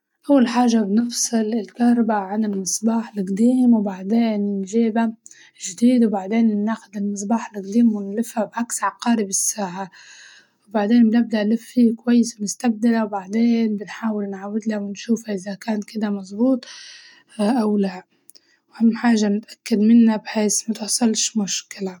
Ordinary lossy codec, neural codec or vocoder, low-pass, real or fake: none; none; 19.8 kHz; real